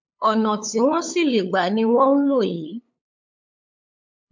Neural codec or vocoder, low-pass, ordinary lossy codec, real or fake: codec, 16 kHz, 8 kbps, FunCodec, trained on LibriTTS, 25 frames a second; 7.2 kHz; MP3, 48 kbps; fake